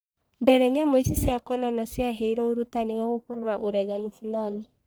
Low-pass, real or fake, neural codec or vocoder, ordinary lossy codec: none; fake; codec, 44.1 kHz, 1.7 kbps, Pupu-Codec; none